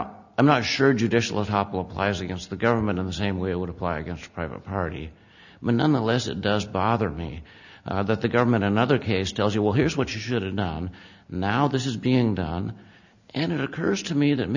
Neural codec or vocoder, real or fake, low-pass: none; real; 7.2 kHz